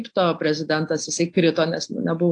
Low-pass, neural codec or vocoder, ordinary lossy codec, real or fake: 9.9 kHz; none; AAC, 48 kbps; real